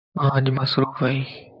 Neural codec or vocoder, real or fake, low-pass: vocoder, 44.1 kHz, 128 mel bands, Pupu-Vocoder; fake; 5.4 kHz